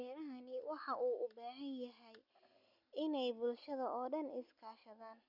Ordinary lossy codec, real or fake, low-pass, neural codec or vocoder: none; real; 5.4 kHz; none